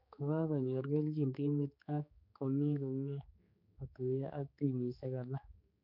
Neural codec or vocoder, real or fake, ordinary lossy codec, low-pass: codec, 16 kHz, 4 kbps, X-Codec, HuBERT features, trained on general audio; fake; none; 5.4 kHz